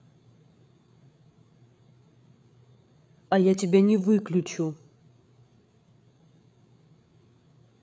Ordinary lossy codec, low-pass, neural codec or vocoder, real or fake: none; none; codec, 16 kHz, 8 kbps, FreqCodec, larger model; fake